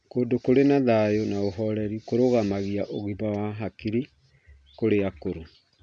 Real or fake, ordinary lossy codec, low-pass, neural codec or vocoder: real; none; 9.9 kHz; none